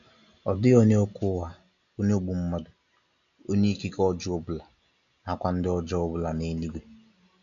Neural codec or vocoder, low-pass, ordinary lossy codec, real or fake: none; 7.2 kHz; none; real